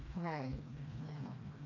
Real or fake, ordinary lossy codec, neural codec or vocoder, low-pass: fake; none; codec, 16 kHz, 2 kbps, FreqCodec, smaller model; 7.2 kHz